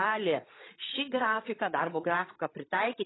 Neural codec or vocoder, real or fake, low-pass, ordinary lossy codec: codec, 16 kHz, 8 kbps, FreqCodec, larger model; fake; 7.2 kHz; AAC, 16 kbps